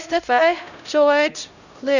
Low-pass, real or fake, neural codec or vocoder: 7.2 kHz; fake; codec, 16 kHz, 0.5 kbps, X-Codec, HuBERT features, trained on LibriSpeech